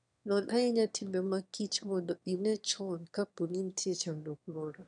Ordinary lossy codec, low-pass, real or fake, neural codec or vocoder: none; 9.9 kHz; fake; autoencoder, 22.05 kHz, a latent of 192 numbers a frame, VITS, trained on one speaker